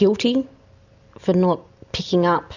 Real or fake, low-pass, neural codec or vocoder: real; 7.2 kHz; none